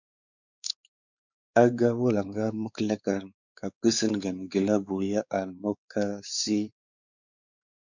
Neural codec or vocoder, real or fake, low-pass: codec, 16 kHz, 4 kbps, X-Codec, WavLM features, trained on Multilingual LibriSpeech; fake; 7.2 kHz